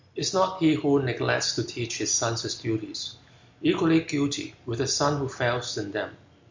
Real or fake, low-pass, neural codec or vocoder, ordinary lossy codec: real; 7.2 kHz; none; MP3, 64 kbps